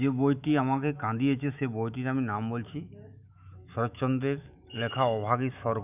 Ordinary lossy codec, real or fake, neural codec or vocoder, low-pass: none; fake; autoencoder, 48 kHz, 128 numbers a frame, DAC-VAE, trained on Japanese speech; 3.6 kHz